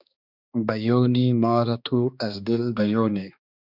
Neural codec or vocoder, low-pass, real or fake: codec, 16 kHz, 2 kbps, X-Codec, HuBERT features, trained on balanced general audio; 5.4 kHz; fake